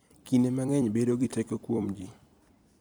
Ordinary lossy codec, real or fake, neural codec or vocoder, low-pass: none; fake; vocoder, 44.1 kHz, 128 mel bands every 256 samples, BigVGAN v2; none